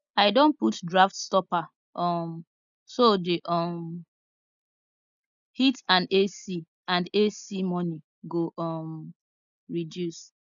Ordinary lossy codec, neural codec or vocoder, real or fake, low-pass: none; none; real; 7.2 kHz